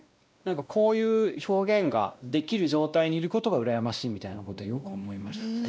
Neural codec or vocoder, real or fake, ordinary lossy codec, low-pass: codec, 16 kHz, 1 kbps, X-Codec, WavLM features, trained on Multilingual LibriSpeech; fake; none; none